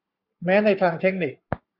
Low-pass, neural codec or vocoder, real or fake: 5.4 kHz; codec, 44.1 kHz, 7.8 kbps, Pupu-Codec; fake